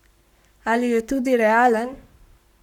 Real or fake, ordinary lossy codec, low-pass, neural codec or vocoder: fake; none; 19.8 kHz; codec, 44.1 kHz, 7.8 kbps, Pupu-Codec